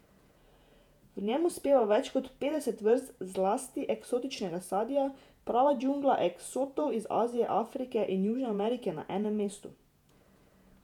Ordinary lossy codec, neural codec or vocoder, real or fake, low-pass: none; vocoder, 48 kHz, 128 mel bands, Vocos; fake; 19.8 kHz